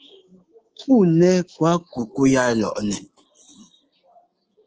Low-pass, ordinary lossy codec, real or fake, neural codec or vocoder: 7.2 kHz; Opus, 16 kbps; fake; codec, 24 kHz, 3.1 kbps, DualCodec